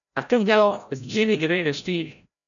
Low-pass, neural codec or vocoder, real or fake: 7.2 kHz; codec, 16 kHz, 0.5 kbps, FreqCodec, larger model; fake